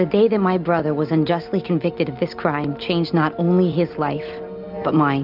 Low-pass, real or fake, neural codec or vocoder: 5.4 kHz; real; none